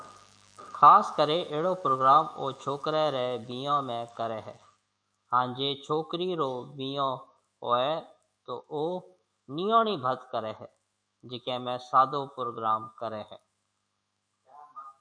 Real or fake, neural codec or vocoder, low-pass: fake; autoencoder, 48 kHz, 128 numbers a frame, DAC-VAE, trained on Japanese speech; 9.9 kHz